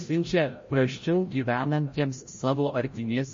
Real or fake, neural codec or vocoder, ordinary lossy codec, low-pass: fake; codec, 16 kHz, 0.5 kbps, FreqCodec, larger model; MP3, 32 kbps; 7.2 kHz